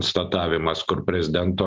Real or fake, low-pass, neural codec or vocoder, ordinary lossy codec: real; 7.2 kHz; none; Opus, 32 kbps